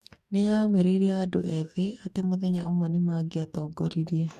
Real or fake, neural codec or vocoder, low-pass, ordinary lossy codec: fake; codec, 44.1 kHz, 2.6 kbps, DAC; 14.4 kHz; none